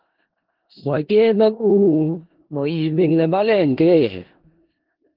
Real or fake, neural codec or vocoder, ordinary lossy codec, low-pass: fake; codec, 16 kHz in and 24 kHz out, 0.4 kbps, LongCat-Audio-Codec, four codebook decoder; Opus, 16 kbps; 5.4 kHz